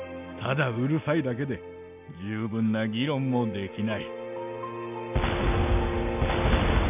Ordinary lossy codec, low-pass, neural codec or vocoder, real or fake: none; 3.6 kHz; none; real